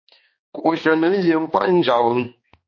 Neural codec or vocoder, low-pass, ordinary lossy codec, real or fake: codec, 16 kHz, 2 kbps, X-Codec, HuBERT features, trained on balanced general audio; 7.2 kHz; MP3, 32 kbps; fake